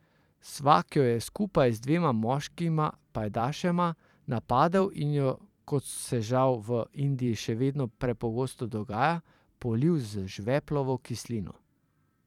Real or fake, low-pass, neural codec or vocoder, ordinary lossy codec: fake; 19.8 kHz; vocoder, 44.1 kHz, 128 mel bands every 512 samples, BigVGAN v2; none